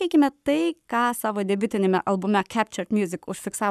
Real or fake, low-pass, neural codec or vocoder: fake; 14.4 kHz; codec, 44.1 kHz, 7.8 kbps, Pupu-Codec